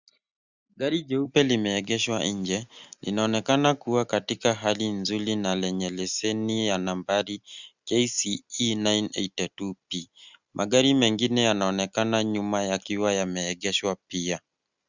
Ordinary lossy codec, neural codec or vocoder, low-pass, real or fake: Opus, 64 kbps; none; 7.2 kHz; real